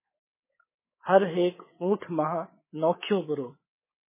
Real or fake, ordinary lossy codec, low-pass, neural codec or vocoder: fake; MP3, 16 kbps; 3.6 kHz; codec, 24 kHz, 3.1 kbps, DualCodec